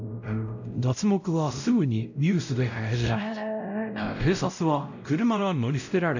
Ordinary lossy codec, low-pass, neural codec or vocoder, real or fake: none; 7.2 kHz; codec, 16 kHz, 0.5 kbps, X-Codec, WavLM features, trained on Multilingual LibriSpeech; fake